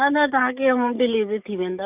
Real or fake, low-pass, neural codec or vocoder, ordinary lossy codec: fake; 3.6 kHz; codec, 44.1 kHz, 7.8 kbps, DAC; Opus, 64 kbps